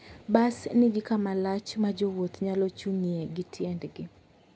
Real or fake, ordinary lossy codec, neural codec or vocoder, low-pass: real; none; none; none